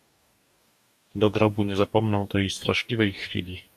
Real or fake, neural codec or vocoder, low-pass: fake; codec, 44.1 kHz, 2.6 kbps, DAC; 14.4 kHz